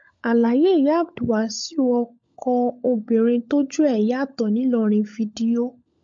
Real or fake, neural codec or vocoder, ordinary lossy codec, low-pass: fake; codec, 16 kHz, 16 kbps, FunCodec, trained on LibriTTS, 50 frames a second; MP3, 48 kbps; 7.2 kHz